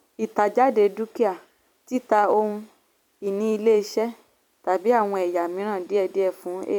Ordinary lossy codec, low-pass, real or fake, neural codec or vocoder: none; 19.8 kHz; fake; vocoder, 44.1 kHz, 128 mel bands every 256 samples, BigVGAN v2